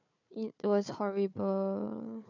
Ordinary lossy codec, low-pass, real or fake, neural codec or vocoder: none; 7.2 kHz; fake; codec, 16 kHz, 4 kbps, FunCodec, trained on Chinese and English, 50 frames a second